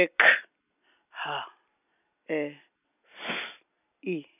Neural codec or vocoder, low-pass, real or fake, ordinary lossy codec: none; 3.6 kHz; real; none